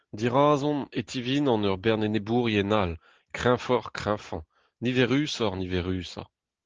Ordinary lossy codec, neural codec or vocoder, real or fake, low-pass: Opus, 16 kbps; none; real; 7.2 kHz